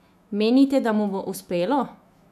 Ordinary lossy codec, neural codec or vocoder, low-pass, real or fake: none; autoencoder, 48 kHz, 128 numbers a frame, DAC-VAE, trained on Japanese speech; 14.4 kHz; fake